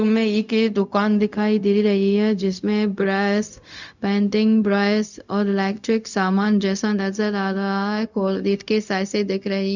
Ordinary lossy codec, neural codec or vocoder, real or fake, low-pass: none; codec, 16 kHz, 0.4 kbps, LongCat-Audio-Codec; fake; 7.2 kHz